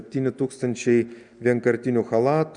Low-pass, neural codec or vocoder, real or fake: 9.9 kHz; none; real